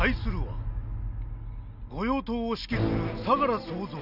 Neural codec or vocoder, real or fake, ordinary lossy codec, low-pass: none; real; none; 5.4 kHz